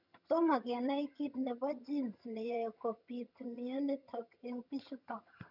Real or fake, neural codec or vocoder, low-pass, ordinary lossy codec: fake; vocoder, 22.05 kHz, 80 mel bands, HiFi-GAN; 5.4 kHz; none